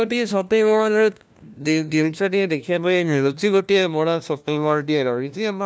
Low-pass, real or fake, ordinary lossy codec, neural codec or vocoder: none; fake; none; codec, 16 kHz, 1 kbps, FunCodec, trained on LibriTTS, 50 frames a second